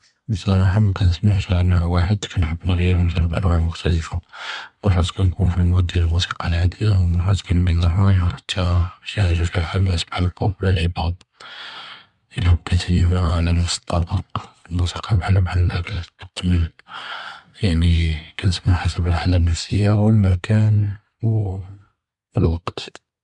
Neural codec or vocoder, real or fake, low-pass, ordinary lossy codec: codec, 24 kHz, 1 kbps, SNAC; fake; 10.8 kHz; none